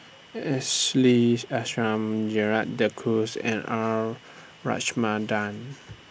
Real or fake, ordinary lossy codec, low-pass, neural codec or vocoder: real; none; none; none